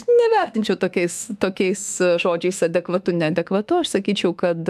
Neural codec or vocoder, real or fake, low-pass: autoencoder, 48 kHz, 32 numbers a frame, DAC-VAE, trained on Japanese speech; fake; 14.4 kHz